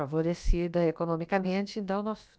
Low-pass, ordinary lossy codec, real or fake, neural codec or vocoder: none; none; fake; codec, 16 kHz, about 1 kbps, DyCAST, with the encoder's durations